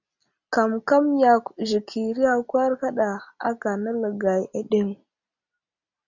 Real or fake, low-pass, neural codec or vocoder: real; 7.2 kHz; none